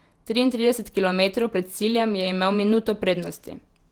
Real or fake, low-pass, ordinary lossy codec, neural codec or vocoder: fake; 19.8 kHz; Opus, 24 kbps; vocoder, 48 kHz, 128 mel bands, Vocos